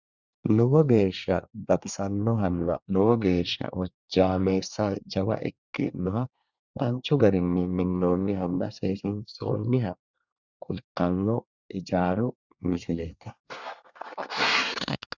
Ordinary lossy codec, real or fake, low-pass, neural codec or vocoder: Opus, 64 kbps; fake; 7.2 kHz; codec, 24 kHz, 1 kbps, SNAC